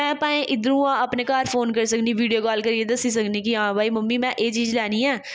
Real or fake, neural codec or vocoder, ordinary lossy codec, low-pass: real; none; none; none